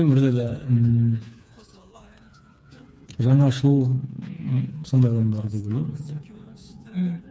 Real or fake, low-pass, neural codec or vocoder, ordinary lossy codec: fake; none; codec, 16 kHz, 4 kbps, FreqCodec, smaller model; none